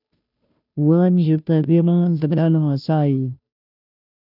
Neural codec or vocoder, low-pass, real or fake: codec, 16 kHz, 0.5 kbps, FunCodec, trained on Chinese and English, 25 frames a second; 5.4 kHz; fake